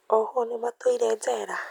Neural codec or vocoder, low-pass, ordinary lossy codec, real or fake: none; 19.8 kHz; none; real